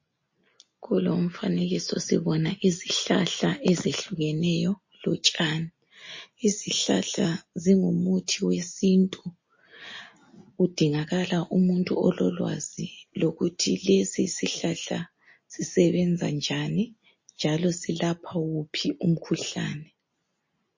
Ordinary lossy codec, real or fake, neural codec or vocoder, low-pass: MP3, 32 kbps; real; none; 7.2 kHz